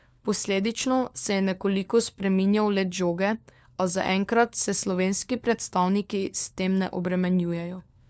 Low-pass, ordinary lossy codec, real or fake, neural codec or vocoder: none; none; fake; codec, 16 kHz, 4 kbps, FunCodec, trained on LibriTTS, 50 frames a second